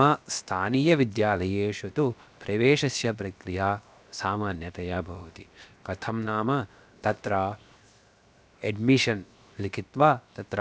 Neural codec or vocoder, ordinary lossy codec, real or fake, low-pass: codec, 16 kHz, 0.7 kbps, FocalCodec; none; fake; none